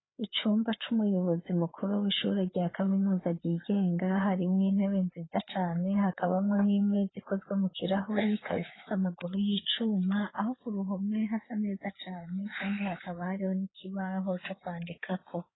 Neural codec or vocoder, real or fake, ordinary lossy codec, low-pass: codec, 16 kHz, 4 kbps, FreqCodec, larger model; fake; AAC, 16 kbps; 7.2 kHz